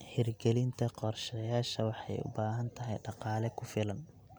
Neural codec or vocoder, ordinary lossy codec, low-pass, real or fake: none; none; none; real